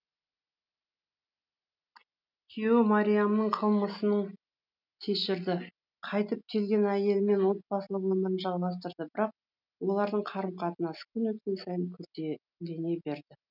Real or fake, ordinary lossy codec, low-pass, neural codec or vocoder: real; none; 5.4 kHz; none